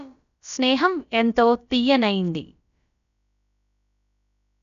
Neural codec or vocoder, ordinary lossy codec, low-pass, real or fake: codec, 16 kHz, about 1 kbps, DyCAST, with the encoder's durations; none; 7.2 kHz; fake